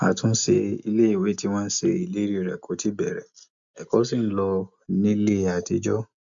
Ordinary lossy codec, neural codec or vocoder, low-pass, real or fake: MP3, 64 kbps; none; 7.2 kHz; real